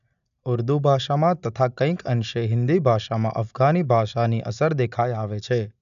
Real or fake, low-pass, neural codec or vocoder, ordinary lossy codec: real; 7.2 kHz; none; none